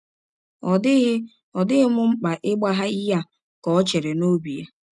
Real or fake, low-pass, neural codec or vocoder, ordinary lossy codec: real; 10.8 kHz; none; none